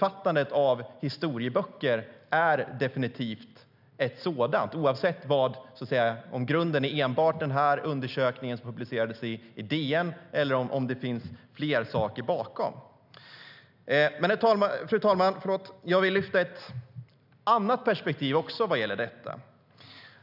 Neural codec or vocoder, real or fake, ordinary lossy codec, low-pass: none; real; none; 5.4 kHz